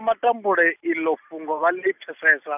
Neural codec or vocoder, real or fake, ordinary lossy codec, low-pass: none; real; none; 3.6 kHz